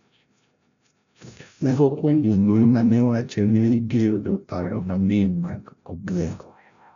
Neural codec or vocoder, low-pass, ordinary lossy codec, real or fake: codec, 16 kHz, 0.5 kbps, FreqCodec, larger model; 7.2 kHz; none; fake